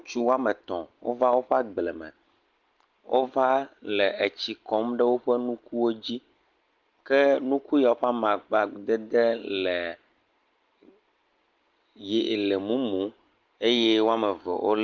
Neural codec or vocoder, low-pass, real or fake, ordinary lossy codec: none; 7.2 kHz; real; Opus, 32 kbps